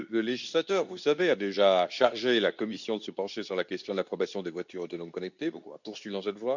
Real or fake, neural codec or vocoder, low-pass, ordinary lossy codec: fake; codec, 24 kHz, 0.9 kbps, WavTokenizer, medium speech release version 2; 7.2 kHz; none